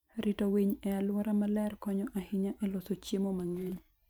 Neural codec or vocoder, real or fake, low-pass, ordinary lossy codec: vocoder, 44.1 kHz, 128 mel bands every 512 samples, BigVGAN v2; fake; none; none